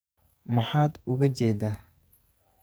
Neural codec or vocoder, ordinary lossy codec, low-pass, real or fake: codec, 44.1 kHz, 2.6 kbps, SNAC; none; none; fake